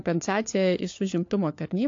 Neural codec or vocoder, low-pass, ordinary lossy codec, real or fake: codec, 16 kHz, 4 kbps, FunCodec, trained on LibriTTS, 50 frames a second; 7.2 kHz; AAC, 48 kbps; fake